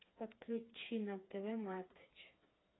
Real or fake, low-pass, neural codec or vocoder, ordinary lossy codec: fake; 7.2 kHz; codec, 16 kHz, 4 kbps, FreqCodec, smaller model; AAC, 16 kbps